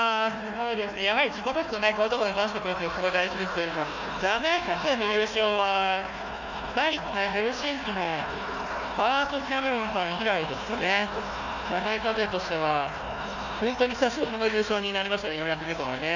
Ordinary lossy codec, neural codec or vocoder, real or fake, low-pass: none; codec, 16 kHz, 1 kbps, FunCodec, trained on Chinese and English, 50 frames a second; fake; 7.2 kHz